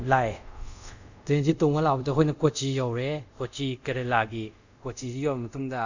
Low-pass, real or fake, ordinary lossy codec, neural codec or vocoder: 7.2 kHz; fake; none; codec, 24 kHz, 0.5 kbps, DualCodec